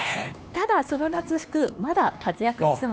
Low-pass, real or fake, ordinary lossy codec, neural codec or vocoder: none; fake; none; codec, 16 kHz, 2 kbps, X-Codec, HuBERT features, trained on LibriSpeech